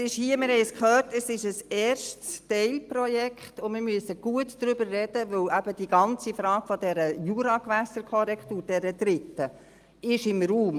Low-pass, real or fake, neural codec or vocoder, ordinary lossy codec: 14.4 kHz; real; none; Opus, 32 kbps